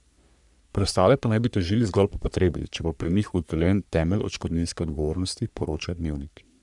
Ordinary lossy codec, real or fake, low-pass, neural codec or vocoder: none; fake; 10.8 kHz; codec, 44.1 kHz, 3.4 kbps, Pupu-Codec